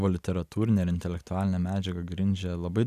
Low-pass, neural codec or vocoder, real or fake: 14.4 kHz; none; real